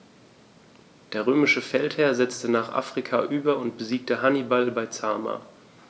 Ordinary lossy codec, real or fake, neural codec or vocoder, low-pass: none; real; none; none